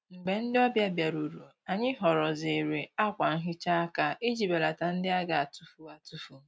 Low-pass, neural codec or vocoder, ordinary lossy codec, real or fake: none; none; none; real